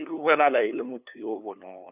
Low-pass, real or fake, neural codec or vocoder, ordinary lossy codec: 3.6 kHz; fake; codec, 16 kHz, 2 kbps, FunCodec, trained on LibriTTS, 25 frames a second; none